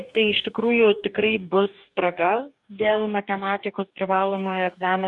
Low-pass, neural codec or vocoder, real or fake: 10.8 kHz; codec, 44.1 kHz, 2.6 kbps, DAC; fake